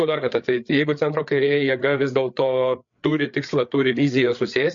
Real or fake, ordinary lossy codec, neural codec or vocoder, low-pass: fake; MP3, 48 kbps; codec, 16 kHz, 8 kbps, FreqCodec, larger model; 7.2 kHz